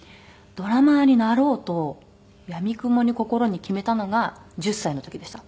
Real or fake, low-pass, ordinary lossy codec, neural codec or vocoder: real; none; none; none